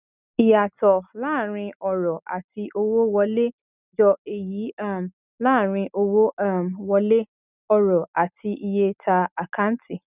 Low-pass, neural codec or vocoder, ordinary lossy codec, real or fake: 3.6 kHz; none; none; real